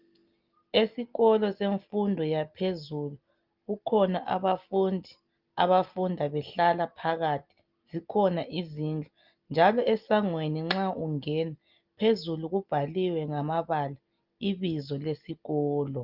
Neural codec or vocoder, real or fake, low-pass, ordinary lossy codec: none; real; 5.4 kHz; Opus, 24 kbps